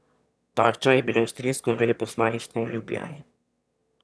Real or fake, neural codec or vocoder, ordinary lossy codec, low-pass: fake; autoencoder, 22.05 kHz, a latent of 192 numbers a frame, VITS, trained on one speaker; none; none